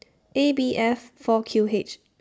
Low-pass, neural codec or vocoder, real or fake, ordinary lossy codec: none; none; real; none